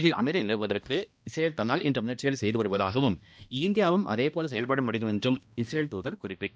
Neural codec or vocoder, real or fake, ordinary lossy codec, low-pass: codec, 16 kHz, 1 kbps, X-Codec, HuBERT features, trained on balanced general audio; fake; none; none